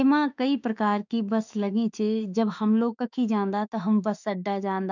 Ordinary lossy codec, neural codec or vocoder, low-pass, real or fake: none; autoencoder, 48 kHz, 32 numbers a frame, DAC-VAE, trained on Japanese speech; 7.2 kHz; fake